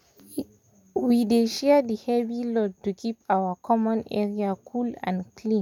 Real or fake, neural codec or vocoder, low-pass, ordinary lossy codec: real; none; 19.8 kHz; none